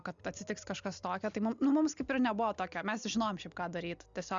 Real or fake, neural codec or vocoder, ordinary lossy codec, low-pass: real; none; Opus, 64 kbps; 7.2 kHz